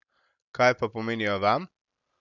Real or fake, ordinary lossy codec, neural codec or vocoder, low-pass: real; none; none; 7.2 kHz